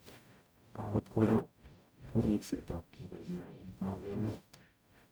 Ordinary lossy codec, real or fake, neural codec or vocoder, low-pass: none; fake; codec, 44.1 kHz, 0.9 kbps, DAC; none